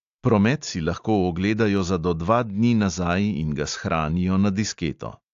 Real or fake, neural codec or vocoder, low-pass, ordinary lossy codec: real; none; 7.2 kHz; AAC, 64 kbps